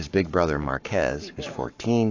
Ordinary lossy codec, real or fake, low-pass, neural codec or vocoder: AAC, 48 kbps; fake; 7.2 kHz; codec, 44.1 kHz, 7.8 kbps, Pupu-Codec